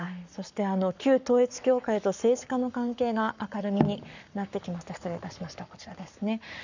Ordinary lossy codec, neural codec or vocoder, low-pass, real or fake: none; codec, 44.1 kHz, 7.8 kbps, Pupu-Codec; 7.2 kHz; fake